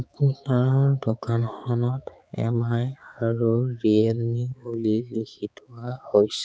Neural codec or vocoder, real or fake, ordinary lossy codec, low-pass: codec, 16 kHz, 4 kbps, X-Codec, HuBERT features, trained on balanced general audio; fake; none; none